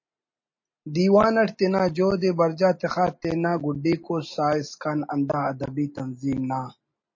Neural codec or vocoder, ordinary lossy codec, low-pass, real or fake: none; MP3, 32 kbps; 7.2 kHz; real